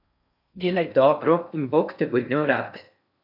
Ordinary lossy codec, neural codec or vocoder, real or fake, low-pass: none; codec, 16 kHz in and 24 kHz out, 0.6 kbps, FocalCodec, streaming, 4096 codes; fake; 5.4 kHz